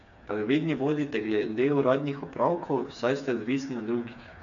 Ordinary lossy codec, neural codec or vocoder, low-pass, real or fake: none; codec, 16 kHz, 4 kbps, FreqCodec, smaller model; 7.2 kHz; fake